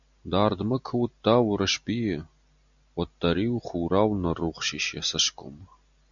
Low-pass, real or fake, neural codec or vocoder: 7.2 kHz; real; none